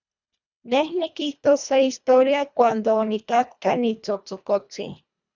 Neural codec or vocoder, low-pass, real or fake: codec, 24 kHz, 1.5 kbps, HILCodec; 7.2 kHz; fake